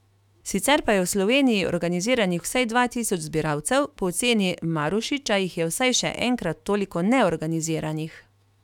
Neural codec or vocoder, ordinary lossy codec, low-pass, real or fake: autoencoder, 48 kHz, 128 numbers a frame, DAC-VAE, trained on Japanese speech; none; 19.8 kHz; fake